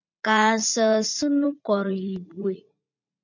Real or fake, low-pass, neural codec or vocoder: fake; 7.2 kHz; vocoder, 44.1 kHz, 80 mel bands, Vocos